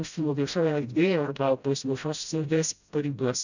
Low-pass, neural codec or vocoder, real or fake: 7.2 kHz; codec, 16 kHz, 0.5 kbps, FreqCodec, smaller model; fake